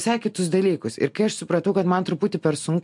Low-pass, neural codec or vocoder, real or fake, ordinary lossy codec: 10.8 kHz; none; real; MP3, 64 kbps